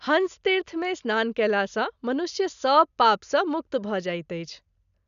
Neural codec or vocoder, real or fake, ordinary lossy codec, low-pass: none; real; none; 7.2 kHz